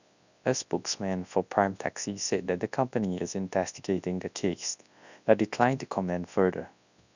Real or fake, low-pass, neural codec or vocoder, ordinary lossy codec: fake; 7.2 kHz; codec, 24 kHz, 0.9 kbps, WavTokenizer, large speech release; none